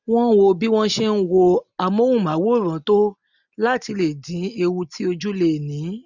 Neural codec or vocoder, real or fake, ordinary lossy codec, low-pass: none; real; none; 7.2 kHz